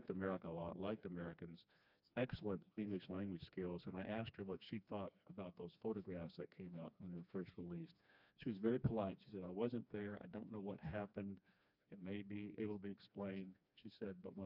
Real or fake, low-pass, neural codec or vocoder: fake; 5.4 kHz; codec, 16 kHz, 2 kbps, FreqCodec, smaller model